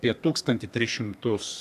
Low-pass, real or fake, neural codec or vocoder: 14.4 kHz; fake; codec, 32 kHz, 1.9 kbps, SNAC